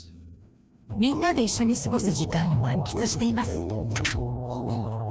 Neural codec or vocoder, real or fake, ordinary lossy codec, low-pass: codec, 16 kHz, 1 kbps, FreqCodec, larger model; fake; none; none